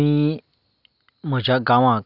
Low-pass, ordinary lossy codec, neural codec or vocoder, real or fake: 5.4 kHz; none; none; real